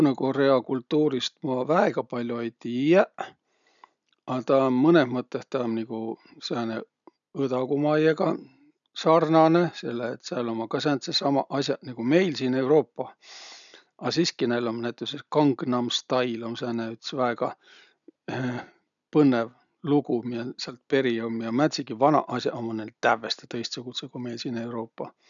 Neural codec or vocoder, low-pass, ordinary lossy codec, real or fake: none; 7.2 kHz; none; real